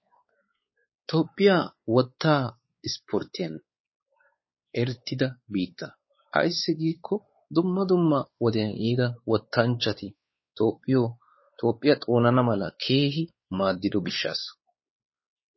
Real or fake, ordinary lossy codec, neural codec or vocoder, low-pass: fake; MP3, 24 kbps; codec, 16 kHz, 4 kbps, X-Codec, WavLM features, trained on Multilingual LibriSpeech; 7.2 kHz